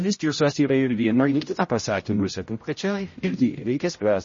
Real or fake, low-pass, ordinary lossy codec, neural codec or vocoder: fake; 7.2 kHz; MP3, 32 kbps; codec, 16 kHz, 0.5 kbps, X-Codec, HuBERT features, trained on general audio